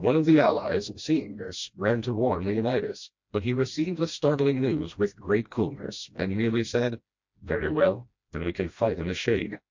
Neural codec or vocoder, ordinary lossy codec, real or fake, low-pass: codec, 16 kHz, 1 kbps, FreqCodec, smaller model; MP3, 48 kbps; fake; 7.2 kHz